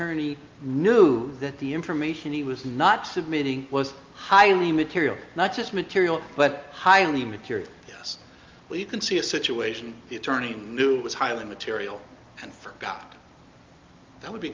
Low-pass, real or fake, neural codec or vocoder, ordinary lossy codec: 7.2 kHz; real; none; Opus, 32 kbps